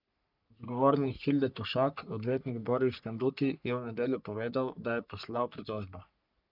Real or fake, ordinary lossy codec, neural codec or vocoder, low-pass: fake; none; codec, 44.1 kHz, 3.4 kbps, Pupu-Codec; 5.4 kHz